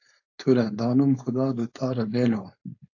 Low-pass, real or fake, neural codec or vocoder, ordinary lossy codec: 7.2 kHz; fake; codec, 16 kHz, 4.8 kbps, FACodec; AAC, 48 kbps